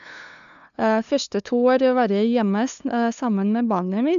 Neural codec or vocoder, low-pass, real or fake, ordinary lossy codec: codec, 16 kHz, 2 kbps, FunCodec, trained on LibriTTS, 25 frames a second; 7.2 kHz; fake; none